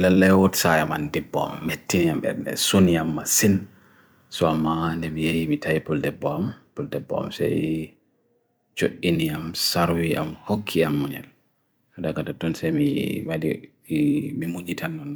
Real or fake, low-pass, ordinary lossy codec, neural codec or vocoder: fake; none; none; vocoder, 44.1 kHz, 128 mel bands every 256 samples, BigVGAN v2